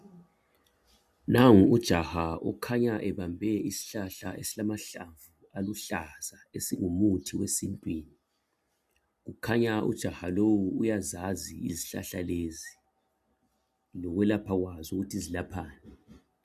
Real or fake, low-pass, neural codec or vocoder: real; 14.4 kHz; none